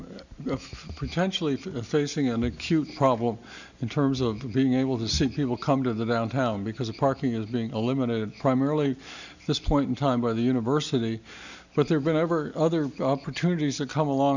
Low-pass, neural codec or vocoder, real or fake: 7.2 kHz; none; real